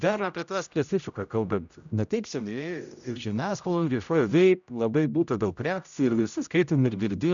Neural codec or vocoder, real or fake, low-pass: codec, 16 kHz, 0.5 kbps, X-Codec, HuBERT features, trained on general audio; fake; 7.2 kHz